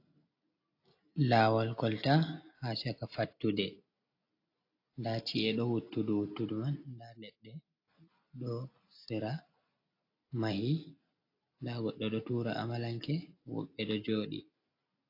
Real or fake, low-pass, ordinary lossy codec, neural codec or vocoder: real; 5.4 kHz; AAC, 32 kbps; none